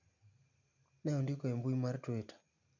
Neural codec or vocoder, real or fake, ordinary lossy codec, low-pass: none; real; none; 7.2 kHz